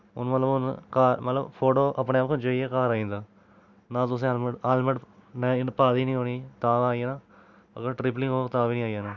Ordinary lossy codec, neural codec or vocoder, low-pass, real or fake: none; none; 7.2 kHz; real